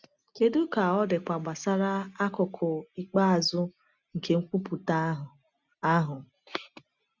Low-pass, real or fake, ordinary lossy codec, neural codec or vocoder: 7.2 kHz; real; none; none